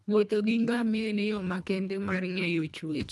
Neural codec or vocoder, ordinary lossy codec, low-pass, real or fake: codec, 24 kHz, 1.5 kbps, HILCodec; none; none; fake